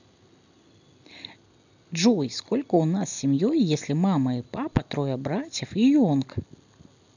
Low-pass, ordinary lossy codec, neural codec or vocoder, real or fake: 7.2 kHz; none; none; real